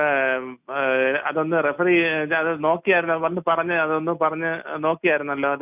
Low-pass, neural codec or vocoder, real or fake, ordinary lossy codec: 3.6 kHz; none; real; none